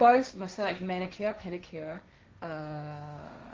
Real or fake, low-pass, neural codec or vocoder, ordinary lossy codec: fake; 7.2 kHz; codec, 16 kHz, 1.1 kbps, Voila-Tokenizer; Opus, 32 kbps